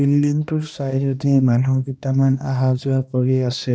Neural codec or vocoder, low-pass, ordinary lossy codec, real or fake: codec, 16 kHz, 1 kbps, X-Codec, HuBERT features, trained on balanced general audio; none; none; fake